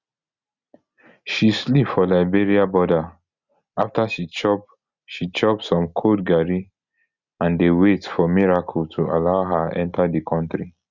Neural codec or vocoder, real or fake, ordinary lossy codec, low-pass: none; real; Opus, 64 kbps; 7.2 kHz